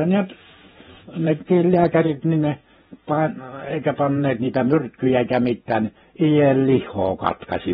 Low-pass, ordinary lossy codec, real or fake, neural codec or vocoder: 19.8 kHz; AAC, 16 kbps; fake; vocoder, 48 kHz, 128 mel bands, Vocos